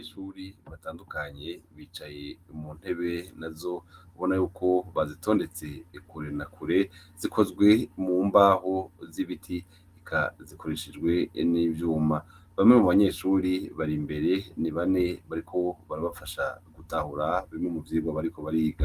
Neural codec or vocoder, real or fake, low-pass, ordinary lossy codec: none; real; 14.4 kHz; Opus, 24 kbps